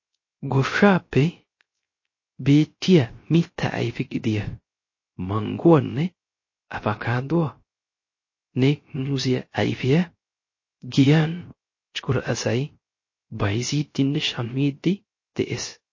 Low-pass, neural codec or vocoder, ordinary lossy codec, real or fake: 7.2 kHz; codec, 16 kHz, 0.3 kbps, FocalCodec; MP3, 32 kbps; fake